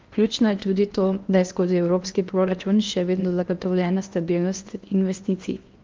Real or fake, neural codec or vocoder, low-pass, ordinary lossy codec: fake; codec, 16 kHz in and 24 kHz out, 0.8 kbps, FocalCodec, streaming, 65536 codes; 7.2 kHz; Opus, 24 kbps